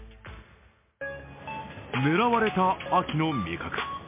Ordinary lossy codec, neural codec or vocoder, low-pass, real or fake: MP3, 24 kbps; none; 3.6 kHz; real